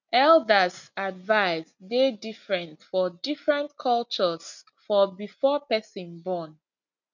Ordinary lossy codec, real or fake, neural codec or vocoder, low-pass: none; real; none; 7.2 kHz